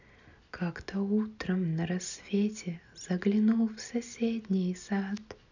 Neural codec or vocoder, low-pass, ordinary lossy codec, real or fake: none; 7.2 kHz; none; real